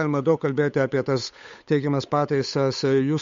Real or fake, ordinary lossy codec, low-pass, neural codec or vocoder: fake; MP3, 48 kbps; 7.2 kHz; codec, 16 kHz, 8 kbps, FunCodec, trained on Chinese and English, 25 frames a second